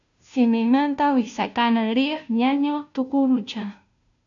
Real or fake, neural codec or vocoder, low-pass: fake; codec, 16 kHz, 0.5 kbps, FunCodec, trained on Chinese and English, 25 frames a second; 7.2 kHz